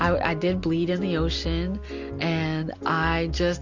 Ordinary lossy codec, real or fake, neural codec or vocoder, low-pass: Opus, 64 kbps; real; none; 7.2 kHz